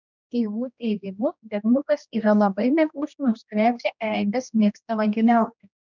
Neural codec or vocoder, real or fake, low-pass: codec, 16 kHz, 1 kbps, X-Codec, HuBERT features, trained on general audio; fake; 7.2 kHz